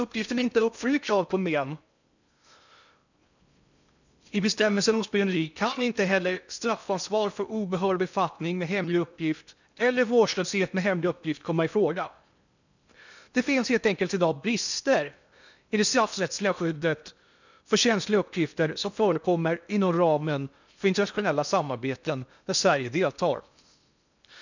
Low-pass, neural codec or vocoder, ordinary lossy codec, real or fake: 7.2 kHz; codec, 16 kHz in and 24 kHz out, 0.6 kbps, FocalCodec, streaming, 2048 codes; none; fake